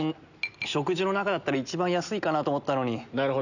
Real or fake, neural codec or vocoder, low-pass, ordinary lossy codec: real; none; 7.2 kHz; none